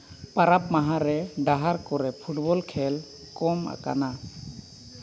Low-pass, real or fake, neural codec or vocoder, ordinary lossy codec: none; real; none; none